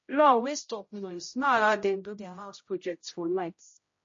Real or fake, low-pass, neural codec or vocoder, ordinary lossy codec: fake; 7.2 kHz; codec, 16 kHz, 0.5 kbps, X-Codec, HuBERT features, trained on general audio; MP3, 32 kbps